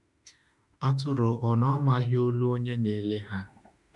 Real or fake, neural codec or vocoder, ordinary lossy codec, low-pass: fake; autoencoder, 48 kHz, 32 numbers a frame, DAC-VAE, trained on Japanese speech; none; 10.8 kHz